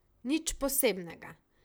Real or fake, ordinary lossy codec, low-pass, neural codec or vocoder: fake; none; none; vocoder, 44.1 kHz, 128 mel bands, Pupu-Vocoder